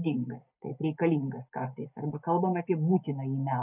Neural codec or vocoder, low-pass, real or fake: none; 3.6 kHz; real